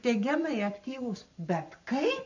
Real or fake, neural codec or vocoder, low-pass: fake; codec, 44.1 kHz, 7.8 kbps, Pupu-Codec; 7.2 kHz